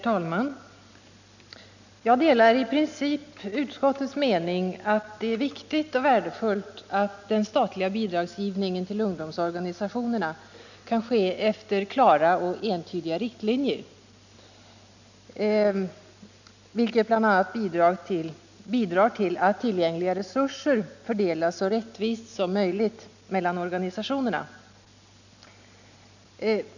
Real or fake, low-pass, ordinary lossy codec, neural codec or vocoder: real; 7.2 kHz; none; none